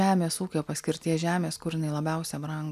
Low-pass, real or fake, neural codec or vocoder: 14.4 kHz; real; none